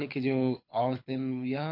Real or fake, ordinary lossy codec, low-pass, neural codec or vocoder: fake; MP3, 32 kbps; 5.4 kHz; codec, 24 kHz, 6 kbps, HILCodec